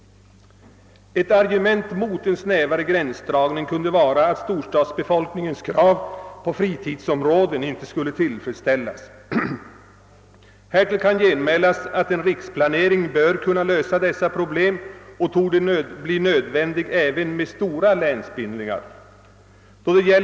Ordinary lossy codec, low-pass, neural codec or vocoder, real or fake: none; none; none; real